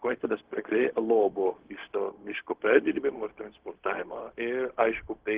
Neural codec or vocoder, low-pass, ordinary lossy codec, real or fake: codec, 16 kHz, 0.4 kbps, LongCat-Audio-Codec; 3.6 kHz; Opus, 16 kbps; fake